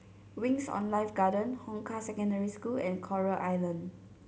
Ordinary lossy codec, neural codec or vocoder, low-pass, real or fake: none; none; none; real